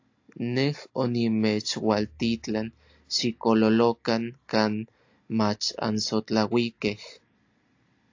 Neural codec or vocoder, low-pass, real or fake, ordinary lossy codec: none; 7.2 kHz; real; AAC, 48 kbps